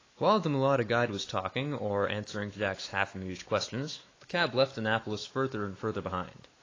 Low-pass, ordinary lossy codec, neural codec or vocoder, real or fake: 7.2 kHz; AAC, 32 kbps; autoencoder, 48 kHz, 128 numbers a frame, DAC-VAE, trained on Japanese speech; fake